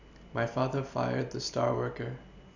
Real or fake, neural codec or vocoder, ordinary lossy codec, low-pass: real; none; none; 7.2 kHz